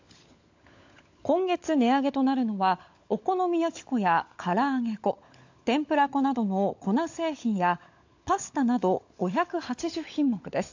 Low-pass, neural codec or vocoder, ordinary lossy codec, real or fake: 7.2 kHz; codec, 16 kHz, 16 kbps, FunCodec, trained on LibriTTS, 50 frames a second; AAC, 48 kbps; fake